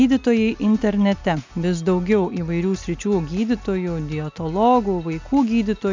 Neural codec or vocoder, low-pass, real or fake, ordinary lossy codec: none; 7.2 kHz; real; MP3, 64 kbps